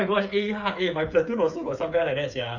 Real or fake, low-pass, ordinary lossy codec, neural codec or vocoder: fake; 7.2 kHz; none; codec, 16 kHz, 8 kbps, FreqCodec, smaller model